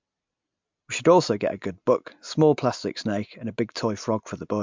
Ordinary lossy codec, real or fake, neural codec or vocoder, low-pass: MP3, 64 kbps; real; none; 7.2 kHz